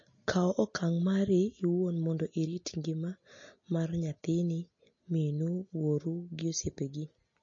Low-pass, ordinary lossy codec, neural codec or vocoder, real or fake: 7.2 kHz; MP3, 32 kbps; none; real